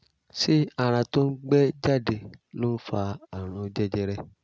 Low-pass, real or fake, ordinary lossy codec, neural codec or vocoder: none; real; none; none